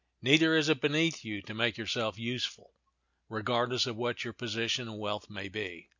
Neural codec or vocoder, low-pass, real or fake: none; 7.2 kHz; real